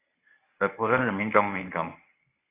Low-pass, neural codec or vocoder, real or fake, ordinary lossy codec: 3.6 kHz; codec, 24 kHz, 0.9 kbps, WavTokenizer, medium speech release version 1; fake; AAC, 24 kbps